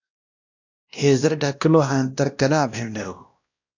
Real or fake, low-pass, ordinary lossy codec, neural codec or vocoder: fake; 7.2 kHz; AAC, 48 kbps; codec, 16 kHz, 1 kbps, X-Codec, WavLM features, trained on Multilingual LibriSpeech